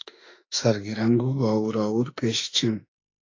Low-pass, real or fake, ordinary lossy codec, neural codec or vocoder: 7.2 kHz; fake; AAC, 32 kbps; autoencoder, 48 kHz, 32 numbers a frame, DAC-VAE, trained on Japanese speech